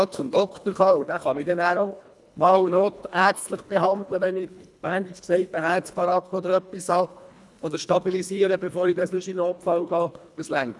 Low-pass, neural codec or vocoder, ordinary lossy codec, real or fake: none; codec, 24 kHz, 1.5 kbps, HILCodec; none; fake